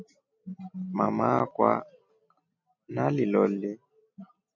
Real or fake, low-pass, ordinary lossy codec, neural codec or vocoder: real; 7.2 kHz; MP3, 48 kbps; none